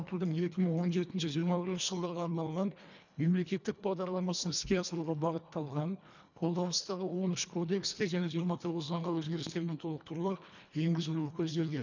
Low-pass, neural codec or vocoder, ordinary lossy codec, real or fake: 7.2 kHz; codec, 24 kHz, 1.5 kbps, HILCodec; none; fake